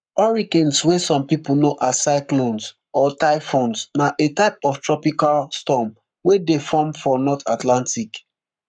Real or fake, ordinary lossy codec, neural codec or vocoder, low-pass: fake; none; codec, 44.1 kHz, 7.8 kbps, Pupu-Codec; 9.9 kHz